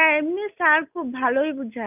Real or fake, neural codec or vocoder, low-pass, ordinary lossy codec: real; none; 3.6 kHz; none